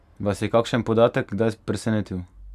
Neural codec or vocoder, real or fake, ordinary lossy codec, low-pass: none; real; none; 14.4 kHz